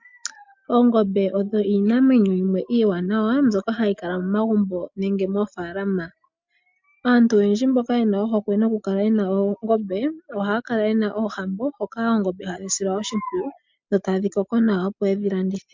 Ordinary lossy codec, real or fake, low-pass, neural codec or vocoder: MP3, 64 kbps; real; 7.2 kHz; none